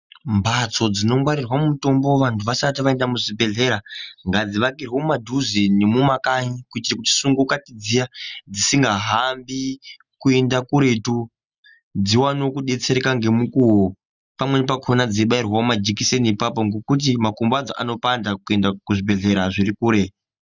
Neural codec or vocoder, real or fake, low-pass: none; real; 7.2 kHz